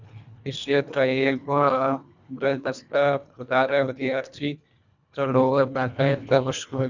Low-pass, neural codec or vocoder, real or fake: 7.2 kHz; codec, 24 kHz, 1.5 kbps, HILCodec; fake